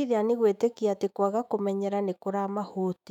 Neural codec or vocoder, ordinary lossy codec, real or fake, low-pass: autoencoder, 48 kHz, 128 numbers a frame, DAC-VAE, trained on Japanese speech; none; fake; 19.8 kHz